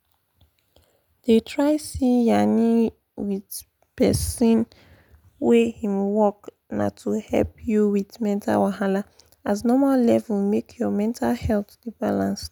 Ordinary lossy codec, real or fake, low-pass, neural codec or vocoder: none; real; 19.8 kHz; none